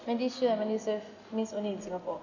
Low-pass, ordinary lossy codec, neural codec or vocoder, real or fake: 7.2 kHz; none; none; real